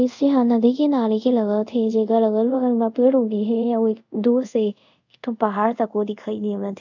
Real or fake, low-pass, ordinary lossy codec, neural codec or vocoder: fake; 7.2 kHz; none; codec, 24 kHz, 0.5 kbps, DualCodec